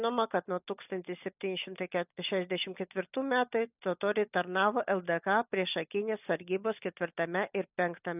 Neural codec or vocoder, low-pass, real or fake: none; 3.6 kHz; real